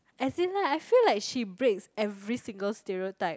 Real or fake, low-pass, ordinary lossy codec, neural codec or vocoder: real; none; none; none